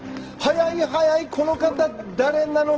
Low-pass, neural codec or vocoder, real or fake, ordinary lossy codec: 7.2 kHz; none; real; Opus, 16 kbps